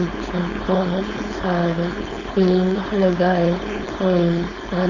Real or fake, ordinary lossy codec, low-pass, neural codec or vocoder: fake; none; 7.2 kHz; codec, 16 kHz, 4.8 kbps, FACodec